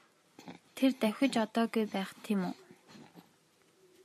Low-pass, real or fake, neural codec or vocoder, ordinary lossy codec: 14.4 kHz; fake; vocoder, 44.1 kHz, 128 mel bands every 256 samples, BigVGAN v2; MP3, 64 kbps